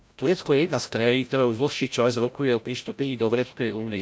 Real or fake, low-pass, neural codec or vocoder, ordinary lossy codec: fake; none; codec, 16 kHz, 0.5 kbps, FreqCodec, larger model; none